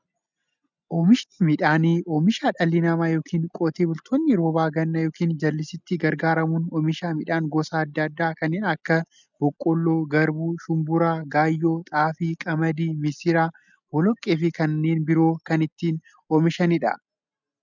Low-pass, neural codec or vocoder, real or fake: 7.2 kHz; none; real